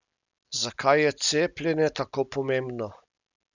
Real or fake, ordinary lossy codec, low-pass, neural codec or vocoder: real; none; 7.2 kHz; none